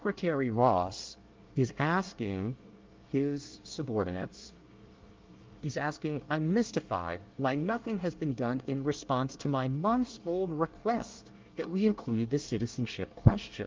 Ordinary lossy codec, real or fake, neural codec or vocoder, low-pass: Opus, 32 kbps; fake; codec, 24 kHz, 1 kbps, SNAC; 7.2 kHz